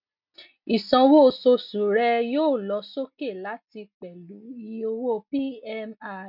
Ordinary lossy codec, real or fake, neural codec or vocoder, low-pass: none; real; none; 5.4 kHz